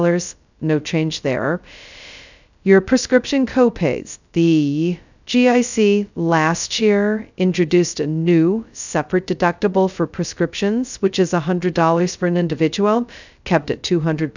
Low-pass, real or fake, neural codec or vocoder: 7.2 kHz; fake; codec, 16 kHz, 0.2 kbps, FocalCodec